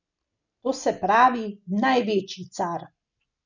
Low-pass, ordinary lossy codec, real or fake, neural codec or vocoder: 7.2 kHz; none; real; none